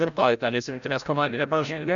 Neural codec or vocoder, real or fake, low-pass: codec, 16 kHz, 0.5 kbps, FreqCodec, larger model; fake; 7.2 kHz